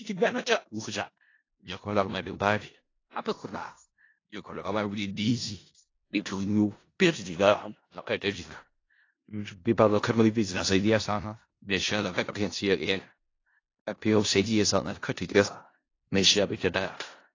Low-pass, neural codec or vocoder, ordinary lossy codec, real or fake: 7.2 kHz; codec, 16 kHz in and 24 kHz out, 0.4 kbps, LongCat-Audio-Codec, four codebook decoder; AAC, 32 kbps; fake